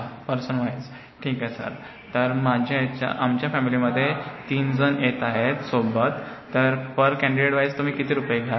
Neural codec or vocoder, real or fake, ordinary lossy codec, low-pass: none; real; MP3, 24 kbps; 7.2 kHz